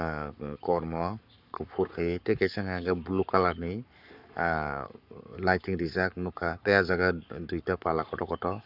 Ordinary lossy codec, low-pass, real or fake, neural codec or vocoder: none; 5.4 kHz; fake; codec, 44.1 kHz, 7.8 kbps, Pupu-Codec